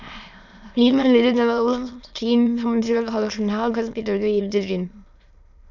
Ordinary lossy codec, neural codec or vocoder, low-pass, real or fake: none; autoencoder, 22.05 kHz, a latent of 192 numbers a frame, VITS, trained on many speakers; 7.2 kHz; fake